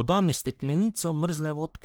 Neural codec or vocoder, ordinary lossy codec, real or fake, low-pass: codec, 44.1 kHz, 1.7 kbps, Pupu-Codec; none; fake; none